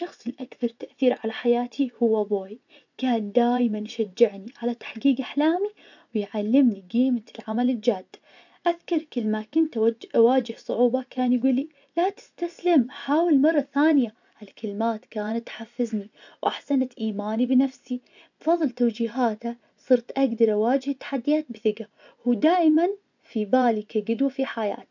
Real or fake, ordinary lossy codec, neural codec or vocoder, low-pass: fake; none; vocoder, 24 kHz, 100 mel bands, Vocos; 7.2 kHz